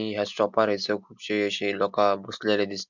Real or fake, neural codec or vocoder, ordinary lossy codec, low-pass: real; none; MP3, 64 kbps; 7.2 kHz